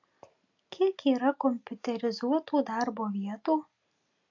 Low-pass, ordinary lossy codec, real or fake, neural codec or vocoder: 7.2 kHz; AAC, 48 kbps; real; none